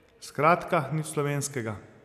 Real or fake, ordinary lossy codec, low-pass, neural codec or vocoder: real; none; 14.4 kHz; none